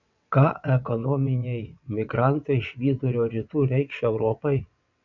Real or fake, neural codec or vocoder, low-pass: fake; vocoder, 22.05 kHz, 80 mel bands, WaveNeXt; 7.2 kHz